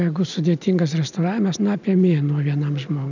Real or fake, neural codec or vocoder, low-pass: real; none; 7.2 kHz